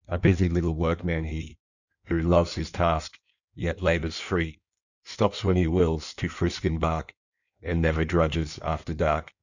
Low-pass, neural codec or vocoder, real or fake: 7.2 kHz; codec, 16 kHz in and 24 kHz out, 1.1 kbps, FireRedTTS-2 codec; fake